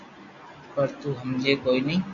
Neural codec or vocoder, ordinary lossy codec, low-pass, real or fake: none; AAC, 48 kbps; 7.2 kHz; real